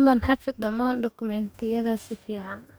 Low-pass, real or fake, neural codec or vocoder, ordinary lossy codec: none; fake; codec, 44.1 kHz, 2.6 kbps, DAC; none